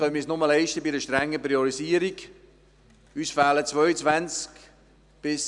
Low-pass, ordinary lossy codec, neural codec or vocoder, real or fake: 10.8 kHz; none; none; real